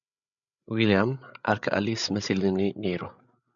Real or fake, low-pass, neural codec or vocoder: fake; 7.2 kHz; codec, 16 kHz, 8 kbps, FreqCodec, larger model